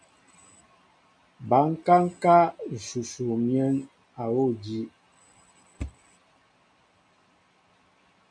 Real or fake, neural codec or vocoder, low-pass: real; none; 9.9 kHz